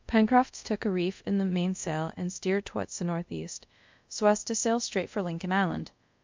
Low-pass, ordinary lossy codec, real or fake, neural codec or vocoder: 7.2 kHz; MP3, 64 kbps; fake; codec, 24 kHz, 0.5 kbps, DualCodec